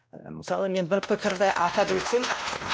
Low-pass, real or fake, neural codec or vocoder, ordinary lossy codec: none; fake; codec, 16 kHz, 1 kbps, X-Codec, WavLM features, trained on Multilingual LibriSpeech; none